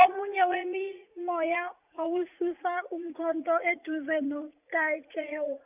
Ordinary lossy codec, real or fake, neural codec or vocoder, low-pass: none; fake; vocoder, 44.1 kHz, 80 mel bands, Vocos; 3.6 kHz